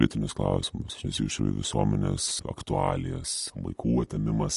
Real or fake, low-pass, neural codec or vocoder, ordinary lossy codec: real; 14.4 kHz; none; MP3, 48 kbps